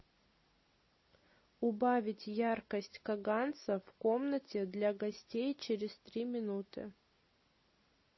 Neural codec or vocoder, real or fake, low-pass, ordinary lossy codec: none; real; 7.2 kHz; MP3, 24 kbps